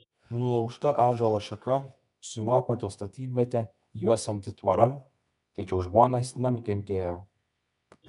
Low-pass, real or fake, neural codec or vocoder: 10.8 kHz; fake; codec, 24 kHz, 0.9 kbps, WavTokenizer, medium music audio release